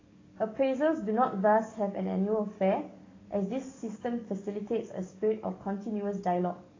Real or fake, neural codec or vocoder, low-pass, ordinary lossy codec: fake; codec, 44.1 kHz, 7.8 kbps, DAC; 7.2 kHz; AAC, 32 kbps